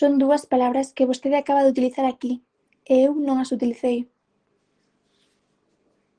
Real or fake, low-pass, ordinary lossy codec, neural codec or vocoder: real; 9.9 kHz; Opus, 16 kbps; none